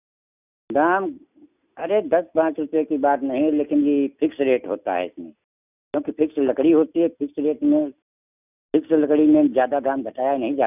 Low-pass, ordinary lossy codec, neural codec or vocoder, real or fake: 3.6 kHz; none; none; real